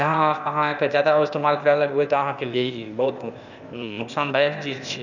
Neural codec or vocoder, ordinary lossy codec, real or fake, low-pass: codec, 16 kHz, 0.8 kbps, ZipCodec; none; fake; 7.2 kHz